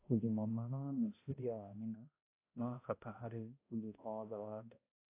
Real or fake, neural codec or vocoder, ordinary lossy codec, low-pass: fake; codec, 16 kHz, 0.5 kbps, X-Codec, HuBERT features, trained on balanced general audio; AAC, 24 kbps; 3.6 kHz